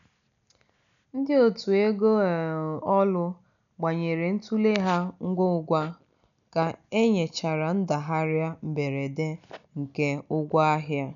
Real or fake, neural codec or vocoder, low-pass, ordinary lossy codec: real; none; 7.2 kHz; none